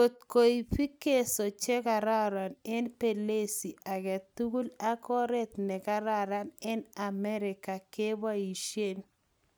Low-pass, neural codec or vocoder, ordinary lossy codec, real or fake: none; none; none; real